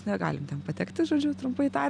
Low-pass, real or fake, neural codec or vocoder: 9.9 kHz; real; none